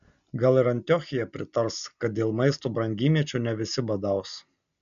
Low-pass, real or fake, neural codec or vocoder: 7.2 kHz; real; none